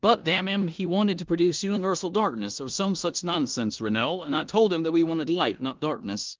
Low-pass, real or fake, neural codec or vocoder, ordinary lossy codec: 7.2 kHz; fake; codec, 16 kHz in and 24 kHz out, 0.9 kbps, LongCat-Audio-Codec, four codebook decoder; Opus, 32 kbps